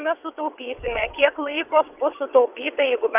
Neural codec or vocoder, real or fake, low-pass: vocoder, 22.05 kHz, 80 mel bands, WaveNeXt; fake; 3.6 kHz